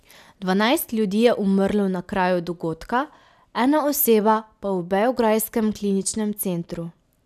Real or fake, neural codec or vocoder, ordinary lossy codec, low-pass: real; none; none; 14.4 kHz